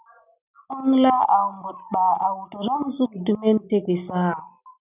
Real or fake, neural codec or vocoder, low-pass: real; none; 3.6 kHz